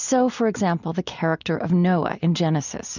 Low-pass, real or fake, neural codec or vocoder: 7.2 kHz; real; none